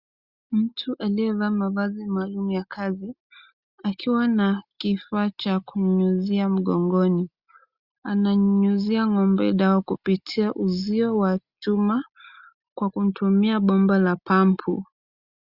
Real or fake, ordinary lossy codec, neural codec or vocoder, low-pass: real; Opus, 64 kbps; none; 5.4 kHz